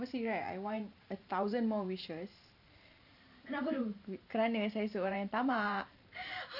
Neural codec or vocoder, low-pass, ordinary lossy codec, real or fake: vocoder, 44.1 kHz, 128 mel bands every 512 samples, BigVGAN v2; 5.4 kHz; none; fake